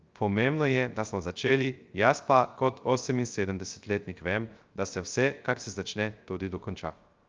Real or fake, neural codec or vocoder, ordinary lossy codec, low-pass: fake; codec, 16 kHz, 0.3 kbps, FocalCodec; Opus, 24 kbps; 7.2 kHz